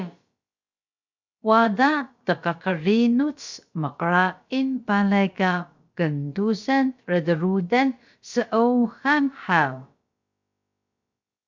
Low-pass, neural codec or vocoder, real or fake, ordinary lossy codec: 7.2 kHz; codec, 16 kHz, about 1 kbps, DyCAST, with the encoder's durations; fake; MP3, 64 kbps